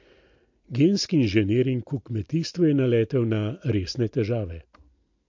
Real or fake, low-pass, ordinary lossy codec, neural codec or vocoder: real; 7.2 kHz; MP3, 48 kbps; none